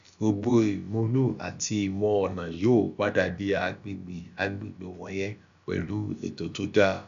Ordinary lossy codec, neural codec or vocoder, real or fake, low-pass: AAC, 96 kbps; codec, 16 kHz, about 1 kbps, DyCAST, with the encoder's durations; fake; 7.2 kHz